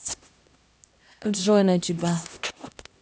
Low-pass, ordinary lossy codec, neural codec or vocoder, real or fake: none; none; codec, 16 kHz, 1 kbps, X-Codec, HuBERT features, trained on LibriSpeech; fake